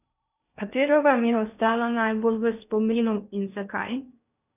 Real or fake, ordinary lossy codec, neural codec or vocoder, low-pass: fake; none; codec, 16 kHz in and 24 kHz out, 0.6 kbps, FocalCodec, streaming, 2048 codes; 3.6 kHz